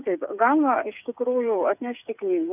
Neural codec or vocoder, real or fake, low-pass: codec, 16 kHz, 8 kbps, FreqCodec, smaller model; fake; 3.6 kHz